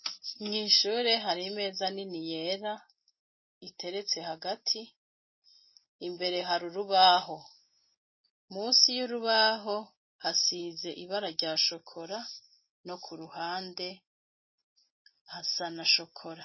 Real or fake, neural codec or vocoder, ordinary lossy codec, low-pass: real; none; MP3, 24 kbps; 7.2 kHz